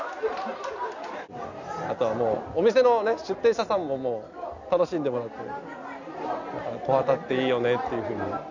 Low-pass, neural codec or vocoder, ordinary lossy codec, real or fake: 7.2 kHz; none; none; real